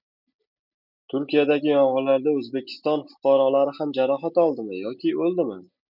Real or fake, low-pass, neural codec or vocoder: real; 5.4 kHz; none